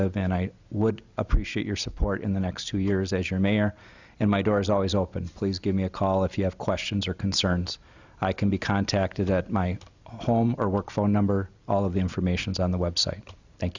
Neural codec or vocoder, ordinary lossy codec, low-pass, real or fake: none; Opus, 64 kbps; 7.2 kHz; real